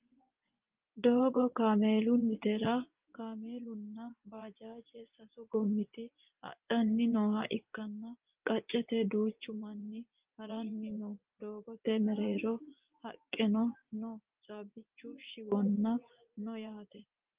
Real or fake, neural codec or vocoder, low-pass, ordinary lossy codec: fake; vocoder, 24 kHz, 100 mel bands, Vocos; 3.6 kHz; Opus, 24 kbps